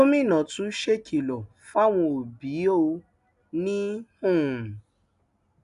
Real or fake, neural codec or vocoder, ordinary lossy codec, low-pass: real; none; none; 10.8 kHz